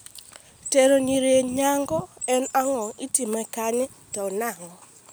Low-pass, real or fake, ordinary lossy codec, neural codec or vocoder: none; real; none; none